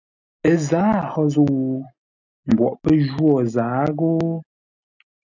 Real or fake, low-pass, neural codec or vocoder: real; 7.2 kHz; none